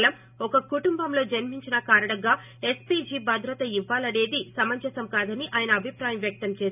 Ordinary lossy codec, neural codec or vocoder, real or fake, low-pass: none; none; real; 3.6 kHz